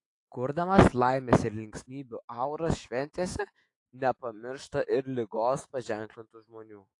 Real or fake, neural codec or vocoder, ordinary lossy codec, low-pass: fake; autoencoder, 48 kHz, 128 numbers a frame, DAC-VAE, trained on Japanese speech; AAC, 48 kbps; 10.8 kHz